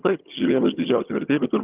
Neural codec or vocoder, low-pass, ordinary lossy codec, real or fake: vocoder, 22.05 kHz, 80 mel bands, HiFi-GAN; 3.6 kHz; Opus, 24 kbps; fake